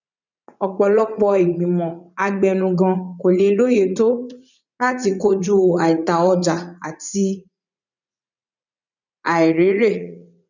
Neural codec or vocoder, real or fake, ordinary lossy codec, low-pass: vocoder, 44.1 kHz, 80 mel bands, Vocos; fake; none; 7.2 kHz